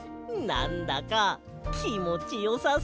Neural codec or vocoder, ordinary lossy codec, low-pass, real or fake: none; none; none; real